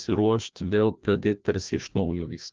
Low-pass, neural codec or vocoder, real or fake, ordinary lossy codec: 7.2 kHz; codec, 16 kHz, 1 kbps, FreqCodec, larger model; fake; Opus, 24 kbps